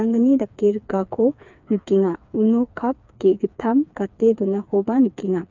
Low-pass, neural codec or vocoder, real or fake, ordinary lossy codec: 7.2 kHz; codec, 16 kHz, 4 kbps, FreqCodec, smaller model; fake; none